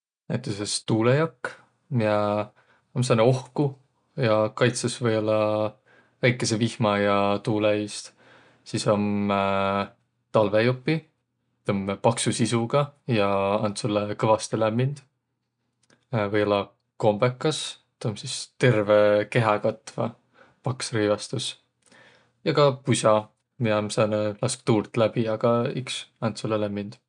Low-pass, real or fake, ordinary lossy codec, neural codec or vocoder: 9.9 kHz; real; none; none